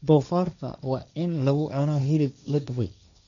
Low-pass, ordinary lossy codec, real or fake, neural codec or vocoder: 7.2 kHz; MP3, 96 kbps; fake; codec, 16 kHz, 1.1 kbps, Voila-Tokenizer